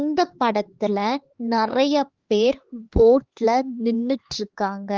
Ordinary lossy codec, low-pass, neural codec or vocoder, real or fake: Opus, 16 kbps; 7.2 kHz; codec, 16 kHz, 4 kbps, X-Codec, HuBERT features, trained on LibriSpeech; fake